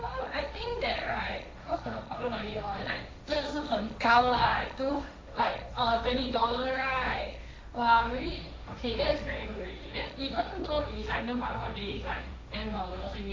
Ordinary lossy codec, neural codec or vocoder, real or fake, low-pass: none; codec, 16 kHz, 1.1 kbps, Voila-Tokenizer; fake; none